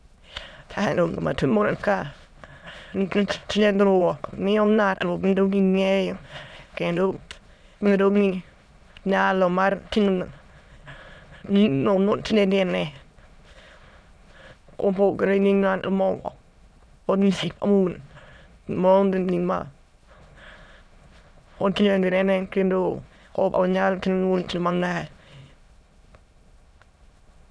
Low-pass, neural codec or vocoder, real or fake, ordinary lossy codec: none; autoencoder, 22.05 kHz, a latent of 192 numbers a frame, VITS, trained on many speakers; fake; none